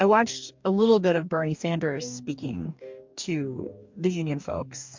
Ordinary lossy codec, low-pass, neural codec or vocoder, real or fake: MP3, 64 kbps; 7.2 kHz; codec, 44.1 kHz, 2.6 kbps, DAC; fake